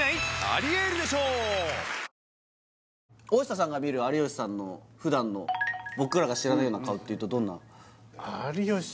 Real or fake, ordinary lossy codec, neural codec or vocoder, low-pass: real; none; none; none